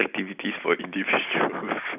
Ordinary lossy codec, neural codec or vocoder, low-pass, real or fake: none; none; 3.6 kHz; real